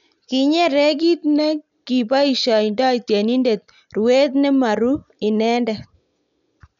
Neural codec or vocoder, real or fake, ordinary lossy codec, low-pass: none; real; none; 7.2 kHz